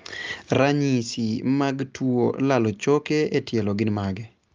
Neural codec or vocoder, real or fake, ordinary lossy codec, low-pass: none; real; Opus, 32 kbps; 7.2 kHz